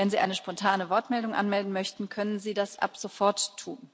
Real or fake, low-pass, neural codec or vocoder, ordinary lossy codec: real; none; none; none